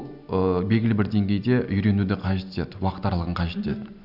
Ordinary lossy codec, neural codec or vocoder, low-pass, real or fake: none; none; 5.4 kHz; real